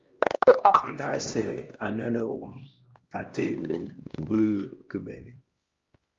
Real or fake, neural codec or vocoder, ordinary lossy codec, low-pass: fake; codec, 16 kHz, 1 kbps, X-Codec, HuBERT features, trained on LibriSpeech; Opus, 32 kbps; 7.2 kHz